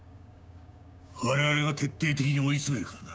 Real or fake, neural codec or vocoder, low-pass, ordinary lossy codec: fake; codec, 16 kHz, 6 kbps, DAC; none; none